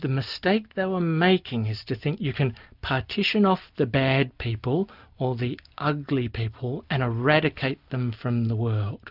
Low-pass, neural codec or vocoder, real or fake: 5.4 kHz; none; real